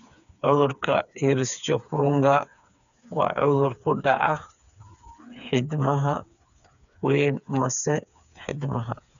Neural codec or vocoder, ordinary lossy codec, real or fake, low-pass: codec, 16 kHz, 4 kbps, FreqCodec, smaller model; none; fake; 7.2 kHz